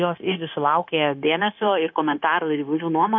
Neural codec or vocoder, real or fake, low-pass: codec, 16 kHz, 0.9 kbps, LongCat-Audio-Codec; fake; 7.2 kHz